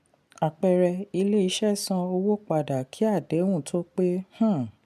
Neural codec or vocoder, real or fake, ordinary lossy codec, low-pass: none; real; MP3, 96 kbps; 14.4 kHz